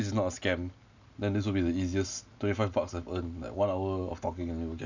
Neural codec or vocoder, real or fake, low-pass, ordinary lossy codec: none; real; 7.2 kHz; none